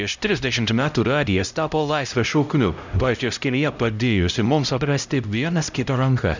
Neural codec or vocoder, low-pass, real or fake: codec, 16 kHz, 0.5 kbps, X-Codec, HuBERT features, trained on LibriSpeech; 7.2 kHz; fake